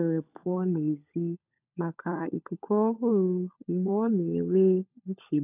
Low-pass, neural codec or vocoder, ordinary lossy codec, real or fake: 3.6 kHz; codec, 16 kHz, 16 kbps, FunCodec, trained on LibriTTS, 50 frames a second; none; fake